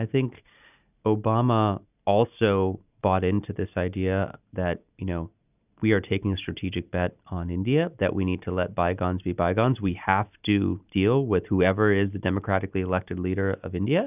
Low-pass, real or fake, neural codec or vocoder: 3.6 kHz; real; none